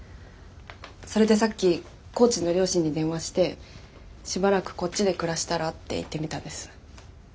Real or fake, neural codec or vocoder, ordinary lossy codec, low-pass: real; none; none; none